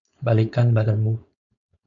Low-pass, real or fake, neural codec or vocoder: 7.2 kHz; fake; codec, 16 kHz, 4.8 kbps, FACodec